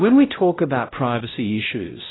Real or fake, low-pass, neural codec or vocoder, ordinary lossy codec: fake; 7.2 kHz; codec, 16 kHz, 0.5 kbps, X-Codec, WavLM features, trained on Multilingual LibriSpeech; AAC, 16 kbps